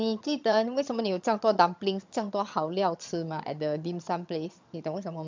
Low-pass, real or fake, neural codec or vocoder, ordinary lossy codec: 7.2 kHz; fake; vocoder, 22.05 kHz, 80 mel bands, HiFi-GAN; MP3, 64 kbps